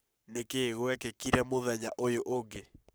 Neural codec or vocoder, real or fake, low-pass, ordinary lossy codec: codec, 44.1 kHz, 7.8 kbps, Pupu-Codec; fake; none; none